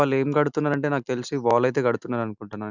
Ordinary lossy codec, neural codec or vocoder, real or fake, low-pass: none; none; real; 7.2 kHz